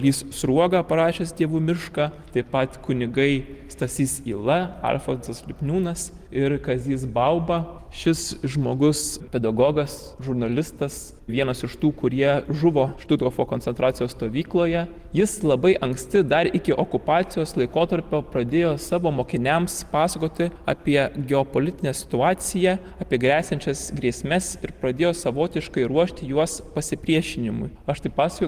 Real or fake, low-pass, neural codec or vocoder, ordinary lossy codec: real; 14.4 kHz; none; Opus, 24 kbps